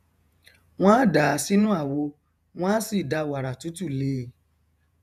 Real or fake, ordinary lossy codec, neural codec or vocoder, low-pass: fake; none; vocoder, 48 kHz, 128 mel bands, Vocos; 14.4 kHz